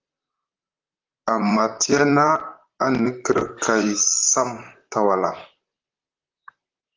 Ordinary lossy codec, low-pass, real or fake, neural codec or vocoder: Opus, 24 kbps; 7.2 kHz; fake; vocoder, 44.1 kHz, 128 mel bands, Pupu-Vocoder